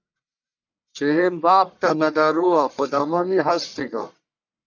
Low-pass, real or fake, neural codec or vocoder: 7.2 kHz; fake; codec, 44.1 kHz, 1.7 kbps, Pupu-Codec